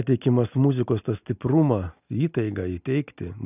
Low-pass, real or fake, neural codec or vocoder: 3.6 kHz; real; none